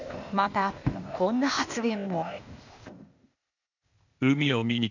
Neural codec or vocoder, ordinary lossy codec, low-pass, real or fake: codec, 16 kHz, 0.8 kbps, ZipCodec; none; 7.2 kHz; fake